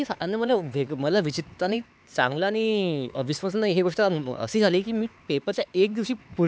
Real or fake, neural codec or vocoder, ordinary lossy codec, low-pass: fake; codec, 16 kHz, 4 kbps, X-Codec, HuBERT features, trained on LibriSpeech; none; none